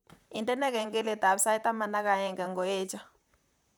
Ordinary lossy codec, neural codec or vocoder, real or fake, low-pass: none; vocoder, 44.1 kHz, 128 mel bands, Pupu-Vocoder; fake; none